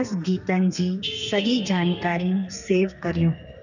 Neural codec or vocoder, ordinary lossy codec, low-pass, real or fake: codec, 32 kHz, 1.9 kbps, SNAC; none; 7.2 kHz; fake